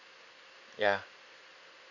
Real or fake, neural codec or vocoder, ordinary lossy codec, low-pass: real; none; none; 7.2 kHz